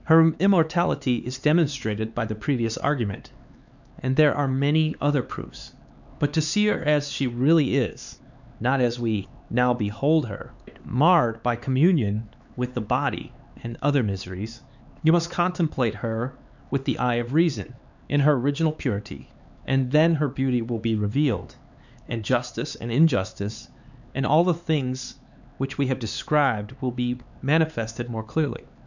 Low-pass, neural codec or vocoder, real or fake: 7.2 kHz; codec, 16 kHz, 4 kbps, X-Codec, HuBERT features, trained on LibriSpeech; fake